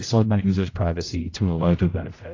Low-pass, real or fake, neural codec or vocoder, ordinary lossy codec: 7.2 kHz; fake; codec, 16 kHz, 0.5 kbps, X-Codec, HuBERT features, trained on general audio; AAC, 32 kbps